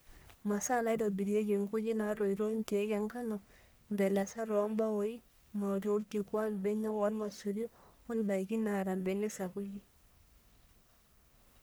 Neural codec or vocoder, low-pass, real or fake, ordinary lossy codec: codec, 44.1 kHz, 1.7 kbps, Pupu-Codec; none; fake; none